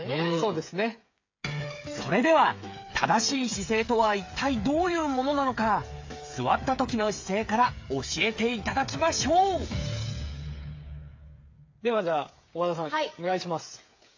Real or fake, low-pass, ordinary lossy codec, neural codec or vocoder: fake; 7.2 kHz; AAC, 48 kbps; codec, 16 kHz, 8 kbps, FreqCodec, smaller model